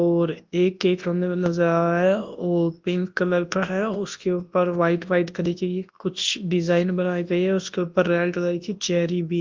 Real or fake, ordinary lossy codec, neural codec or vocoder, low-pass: fake; Opus, 32 kbps; codec, 24 kHz, 0.9 kbps, WavTokenizer, large speech release; 7.2 kHz